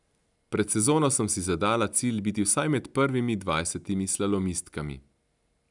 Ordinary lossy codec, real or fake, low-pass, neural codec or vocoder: none; real; 10.8 kHz; none